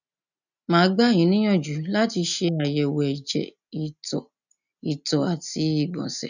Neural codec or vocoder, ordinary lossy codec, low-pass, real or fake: none; none; 7.2 kHz; real